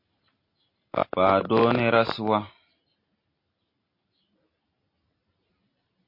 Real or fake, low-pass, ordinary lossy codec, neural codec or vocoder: real; 5.4 kHz; MP3, 32 kbps; none